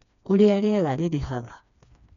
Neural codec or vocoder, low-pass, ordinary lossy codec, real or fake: codec, 16 kHz, 2 kbps, FreqCodec, smaller model; 7.2 kHz; none; fake